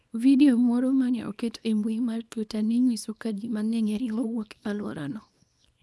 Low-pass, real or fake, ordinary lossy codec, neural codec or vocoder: none; fake; none; codec, 24 kHz, 0.9 kbps, WavTokenizer, small release